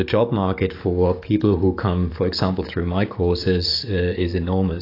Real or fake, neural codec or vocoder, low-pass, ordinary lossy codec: fake; codec, 44.1 kHz, 7.8 kbps, DAC; 5.4 kHz; AAC, 32 kbps